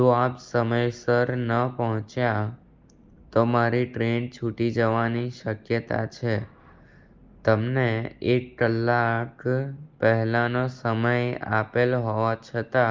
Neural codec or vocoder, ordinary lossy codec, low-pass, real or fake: none; Opus, 32 kbps; 7.2 kHz; real